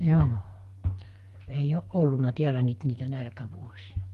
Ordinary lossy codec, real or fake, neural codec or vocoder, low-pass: Opus, 32 kbps; fake; codec, 44.1 kHz, 2.6 kbps, SNAC; 14.4 kHz